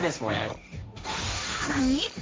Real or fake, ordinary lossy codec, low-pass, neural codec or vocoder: fake; none; none; codec, 16 kHz, 1.1 kbps, Voila-Tokenizer